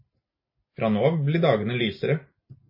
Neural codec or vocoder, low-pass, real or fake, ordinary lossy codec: none; 5.4 kHz; real; MP3, 24 kbps